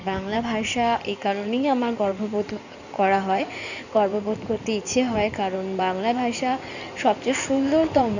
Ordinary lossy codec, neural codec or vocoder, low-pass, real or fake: none; codec, 16 kHz in and 24 kHz out, 2.2 kbps, FireRedTTS-2 codec; 7.2 kHz; fake